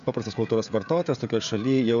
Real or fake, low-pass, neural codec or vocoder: fake; 7.2 kHz; codec, 16 kHz, 16 kbps, FreqCodec, smaller model